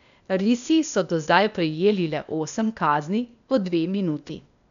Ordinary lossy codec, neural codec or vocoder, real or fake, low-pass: none; codec, 16 kHz, 0.8 kbps, ZipCodec; fake; 7.2 kHz